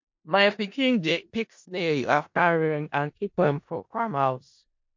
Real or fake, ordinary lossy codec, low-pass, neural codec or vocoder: fake; MP3, 48 kbps; 7.2 kHz; codec, 16 kHz in and 24 kHz out, 0.4 kbps, LongCat-Audio-Codec, four codebook decoder